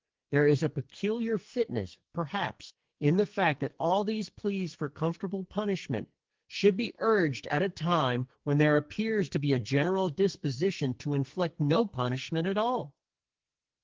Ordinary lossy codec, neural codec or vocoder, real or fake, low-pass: Opus, 16 kbps; codec, 44.1 kHz, 2.6 kbps, SNAC; fake; 7.2 kHz